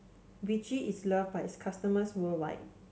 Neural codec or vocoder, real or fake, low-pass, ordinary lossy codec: none; real; none; none